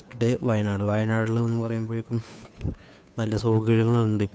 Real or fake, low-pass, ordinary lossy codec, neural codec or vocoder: fake; none; none; codec, 16 kHz, 2 kbps, FunCodec, trained on Chinese and English, 25 frames a second